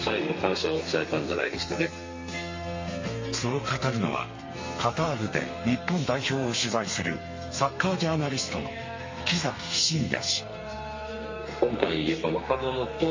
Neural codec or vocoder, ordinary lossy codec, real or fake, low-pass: codec, 32 kHz, 1.9 kbps, SNAC; MP3, 32 kbps; fake; 7.2 kHz